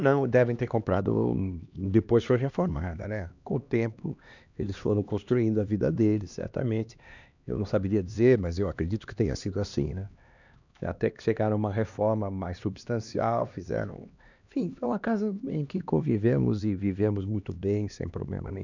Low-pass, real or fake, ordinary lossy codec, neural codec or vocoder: 7.2 kHz; fake; none; codec, 16 kHz, 2 kbps, X-Codec, HuBERT features, trained on LibriSpeech